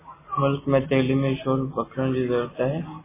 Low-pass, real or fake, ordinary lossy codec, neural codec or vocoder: 3.6 kHz; real; MP3, 16 kbps; none